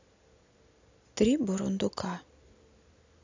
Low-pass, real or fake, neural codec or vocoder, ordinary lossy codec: 7.2 kHz; real; none; AAC, 48 kbps